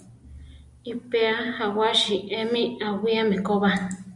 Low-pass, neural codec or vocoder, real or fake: 10.8 kHz; none; real